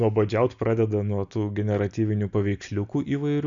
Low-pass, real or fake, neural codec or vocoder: 7.2 kHz; real; none